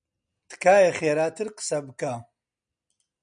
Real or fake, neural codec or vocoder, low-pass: real; none; 9.9 kHz